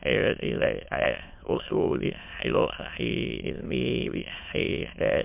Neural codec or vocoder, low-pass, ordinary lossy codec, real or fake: autoencoder, 22.05 kHz, a latent of 192 numbers a frame, VITS, trained on many speakers; 3.6 kHz; MP3, 32 kbps; fake